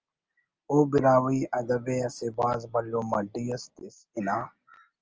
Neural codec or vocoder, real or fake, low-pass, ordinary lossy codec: none; real; 7.2 kHz; Opus, 24 kbps